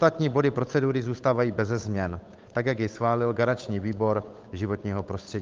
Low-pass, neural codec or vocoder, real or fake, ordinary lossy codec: 7.2 kHz; codec, 16 kHz, 8 kbps, FunCodec, trained on Chinese and English, 25 frames a second; fake; Opus, 24 kbps